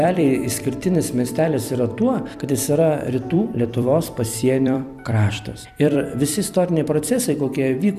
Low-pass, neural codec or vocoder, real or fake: 14.4 kHz; vocoder, 44.1 kHz, 128 mel bands every 256 samples, BigVGAN v2; fake